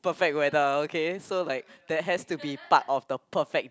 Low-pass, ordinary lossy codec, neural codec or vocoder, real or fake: none; none; none; real